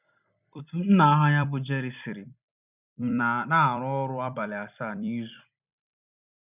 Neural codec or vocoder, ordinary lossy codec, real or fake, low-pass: vocoder, 44.1 kHz, 128 mel bands every 256 samples, BigVGAN v2; none; fake; 3.6 kHz